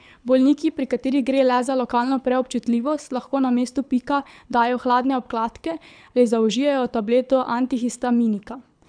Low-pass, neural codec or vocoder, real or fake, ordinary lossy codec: 9.9 kHz; codec, 24 kHz, 6 kbps, HILCodec; fake; none